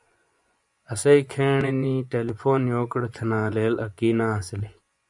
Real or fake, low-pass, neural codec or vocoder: fake; 10.8 kHz; vocoder, 24 kHz, 100 mel bands, Vocos